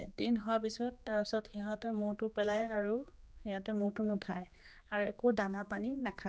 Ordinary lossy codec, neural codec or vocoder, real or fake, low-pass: none; codec, 16 kHz, 2 kbps, X-Codec, HuBERT features, trained on general audio; fake; none